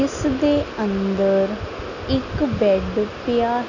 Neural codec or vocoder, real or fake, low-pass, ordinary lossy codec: none; real; 7.2 kHz; none